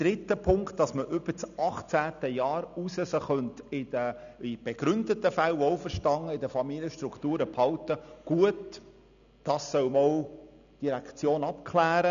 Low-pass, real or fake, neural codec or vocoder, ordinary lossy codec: 7.2 kHz; real; none; none